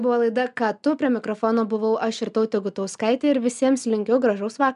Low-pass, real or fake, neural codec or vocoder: 10.8 kHz; real; none